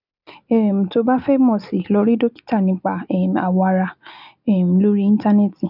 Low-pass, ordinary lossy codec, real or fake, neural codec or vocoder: 5.4 kHz; none; real; none